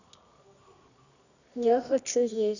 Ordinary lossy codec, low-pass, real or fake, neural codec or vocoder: none; 7.2 kHz; fake; codec, 24 kHz, 0.9 kbps, WavTokenizer, medium music audio release